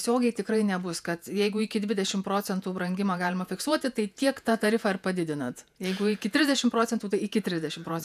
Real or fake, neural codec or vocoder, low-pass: fake; vocoder, 48 kHz, 128 mel bands, Vocos; 14.4 kHz